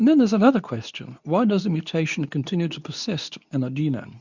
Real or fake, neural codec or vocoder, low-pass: fake; codec, 24 kHz, 0.9 kbps, WavTokenizer, medium speech release version 2; 7.2 kHz